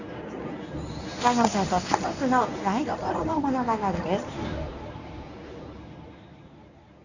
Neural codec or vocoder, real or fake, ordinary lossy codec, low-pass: codec, 24 kHz, 0.9 kbps, WavTokenizer, medium speech release version 1; fake; none; 7.2 kHz